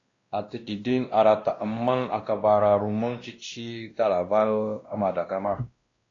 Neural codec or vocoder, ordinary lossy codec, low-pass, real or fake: codec, 16 kHz, 1 kbps, X-Codec, WavLM features, trained on Multilingual LibriSpeech; AAC, 32 kbps; 7.2 kHz; fake